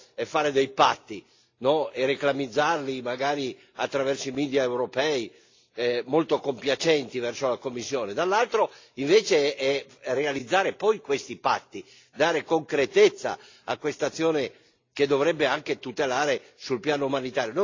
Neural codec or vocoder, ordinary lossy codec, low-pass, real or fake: none; AAC, 48 kbps; 7.2 kHz; real